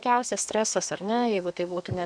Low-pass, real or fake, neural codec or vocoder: 9.9 kHz; fake; codec, 32 kHz, 1.9 kbps, SNAC